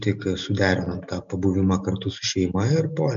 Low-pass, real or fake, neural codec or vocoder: 7.2 kHz; real; none